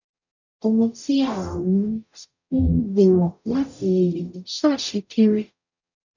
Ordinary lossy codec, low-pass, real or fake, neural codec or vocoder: none; 7.2 kHz; fake; codec, 44.1 kHz, 0.9 kbps, DAC